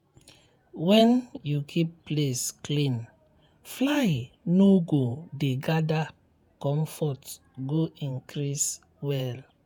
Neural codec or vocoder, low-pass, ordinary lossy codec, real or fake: vocoder, 48 kHz, 128 mel bands, Vocos; none; none; fake